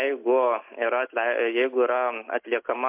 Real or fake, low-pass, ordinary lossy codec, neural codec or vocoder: real; 3.6 kHz; MP3, 32 kbps; none